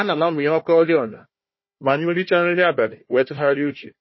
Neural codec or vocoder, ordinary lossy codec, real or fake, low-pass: codec, 16 kHz, 1 kbps, FunCodec, trained on Chinese and English, 50 frames a second; MP3, 24 kbps; fake; 7.2 kHz